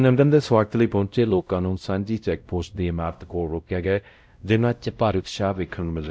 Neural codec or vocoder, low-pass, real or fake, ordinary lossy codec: codec, 16 kHz, 0.5 kbps, X-Codec, WavLM features, trained on Multilingual LibriSpeech; none; fake; none